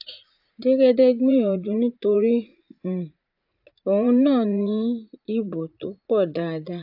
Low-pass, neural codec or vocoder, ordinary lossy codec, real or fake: 5.4 kHz; vocoder, 44.1 kHz, 80 mel bands, Vocos; AAC, 48 kbps; fake